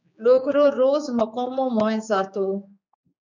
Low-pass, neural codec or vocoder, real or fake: 7.2 kHz; codec, 16 kHz, 4 kbps, X-Codec, HuBERT features, trained on general audio; fake